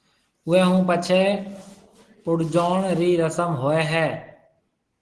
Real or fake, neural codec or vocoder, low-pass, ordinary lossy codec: real; none; 10.8 kHz; Opus, 16 kbps